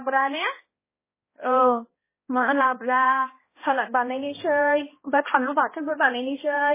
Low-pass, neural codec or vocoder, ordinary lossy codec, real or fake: 3.6 kHz; codec, 16 kHz, 1 kbps, X-Codec, HuBERT features, trained on balanced general audio; MP3, 16 kbps; fake